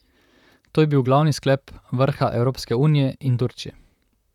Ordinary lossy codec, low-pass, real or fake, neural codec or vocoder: none; 19.8 kHz; fake; vocoder, 44.1 kHz, 128 mel bands, Pupu-Vocoder